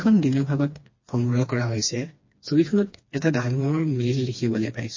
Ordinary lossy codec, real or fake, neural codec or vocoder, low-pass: MP3, 32 kbps; fake; codec, 16 kHz, 2 kbps, FreqCodec, smaller model; 7.2 kHz